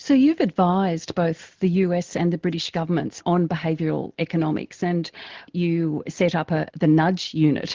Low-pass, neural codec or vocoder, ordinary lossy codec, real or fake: 7.2 kHz; none; Opus, 16 kbps; real